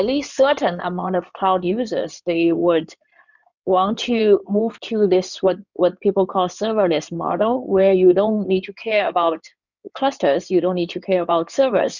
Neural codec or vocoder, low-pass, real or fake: codec, 16 kHz in and 24 kHz out, 2.2 kbps, FireRedTTS-2 codec; 7.2 kHz; fake